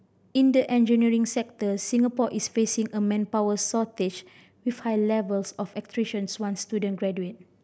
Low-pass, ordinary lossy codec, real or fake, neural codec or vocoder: none; none; real; none